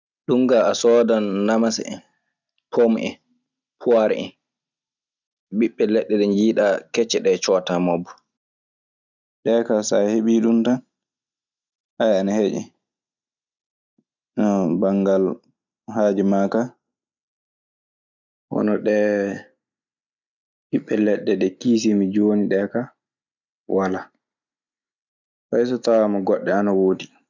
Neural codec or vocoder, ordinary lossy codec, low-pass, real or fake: none; none; 7.2 kHz; real